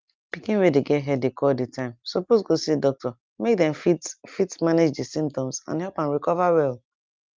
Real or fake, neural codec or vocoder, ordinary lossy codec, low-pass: real; none; Opus, 24 kbps; 7.2 kHz